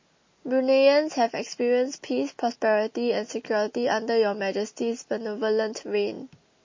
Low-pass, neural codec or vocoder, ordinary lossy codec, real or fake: 7.2 kHz; none; MP3, 32 kbps; real